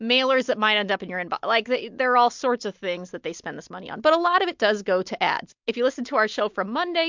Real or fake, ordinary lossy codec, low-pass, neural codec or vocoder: real; MP3, 64 kbps; 7.2 kHz; none